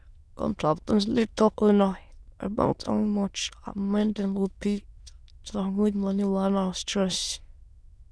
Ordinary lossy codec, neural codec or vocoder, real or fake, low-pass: none; autoencoder, 22.05 kHz, a latent of 192 numbers a frame, VITS, trained on many speakers; fake; none